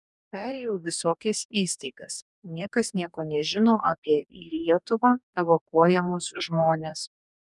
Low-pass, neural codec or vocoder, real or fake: 10.8 kHz; codec, 44.1 kHz, 2.6 kbps, DAC; fake